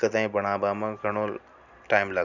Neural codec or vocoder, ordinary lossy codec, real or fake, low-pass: none; none; real; 7.2 kHz